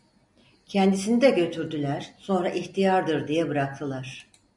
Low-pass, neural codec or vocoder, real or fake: 10.8 kHz; none; real